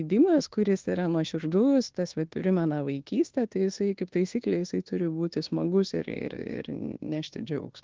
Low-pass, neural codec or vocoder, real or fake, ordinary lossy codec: 7.2 kHz; codec, 24 kHz, 1.2 kbps, DualCodec; fake; Opus, 16 kbps